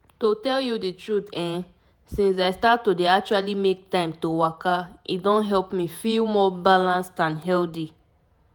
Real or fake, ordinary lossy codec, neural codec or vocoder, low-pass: fake; none; vocoder, 48 kHz, 128 mel bands, Vocos; none